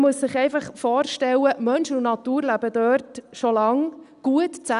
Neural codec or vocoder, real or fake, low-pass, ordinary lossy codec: none; real; 10.8 kHz; none